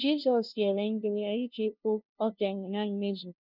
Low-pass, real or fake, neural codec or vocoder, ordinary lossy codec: 5.4 kHz; fake; codec, 16 kHz, 0.5 kbps, FunCodec, trained on LibriTTS, 25 frames a second; none